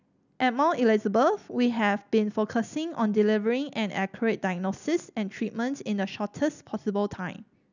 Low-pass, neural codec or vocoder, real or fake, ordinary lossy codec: 7.2 kHz; none; real; none